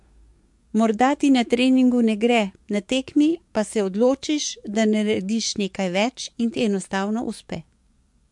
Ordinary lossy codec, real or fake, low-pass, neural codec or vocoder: MP3, 64 kbps; fake; 10.8 kHz; codec, 44.1 kHz, 7.8 kbps, DAC